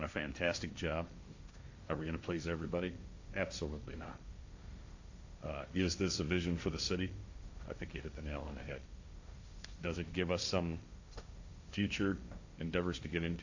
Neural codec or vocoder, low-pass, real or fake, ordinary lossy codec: codec, 16 kHz, 1.1 kbps, Voila-Tokenizer; 7.2 kHz; fake; AAC, 48 kbps